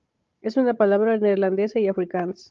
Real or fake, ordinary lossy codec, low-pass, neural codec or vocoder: fake; Opus, 32 kbps; 7.2 kHz; codec, 16 kHz, 16 kbps, FunCodec, trained on Chinese and English, 50 frames a second